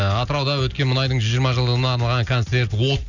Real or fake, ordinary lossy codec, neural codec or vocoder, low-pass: real; none; none; 7.2 kHz